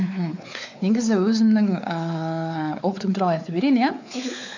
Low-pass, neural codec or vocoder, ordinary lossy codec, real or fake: 7.2 kHz; codec, 16 kHz, 4 kbps, X-Codec, WavLM features, trained on Multilingual LibriSpeech; none; fake